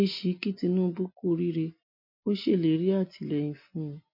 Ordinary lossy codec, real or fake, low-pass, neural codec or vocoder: MP3, 32 kbps; real; 5.4 kHz; none